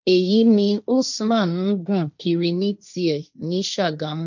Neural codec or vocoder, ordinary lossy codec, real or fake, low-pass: codec, 16 kHz, 1.1 kbps, Voila-Tokenizer; none; fake; 7.2 kHz